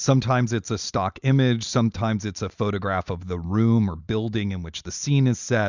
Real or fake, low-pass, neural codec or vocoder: real; 7.2 kHz; none